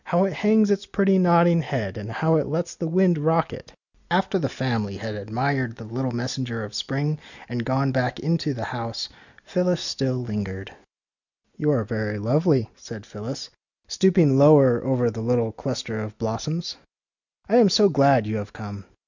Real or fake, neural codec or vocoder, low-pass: real; none; 7.2 kHz